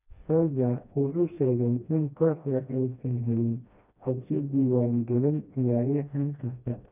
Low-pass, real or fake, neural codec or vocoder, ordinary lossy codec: 3.6 kHz; fake; codec, 16 kHz, 1 kbps, FreqCodec, smaller model; none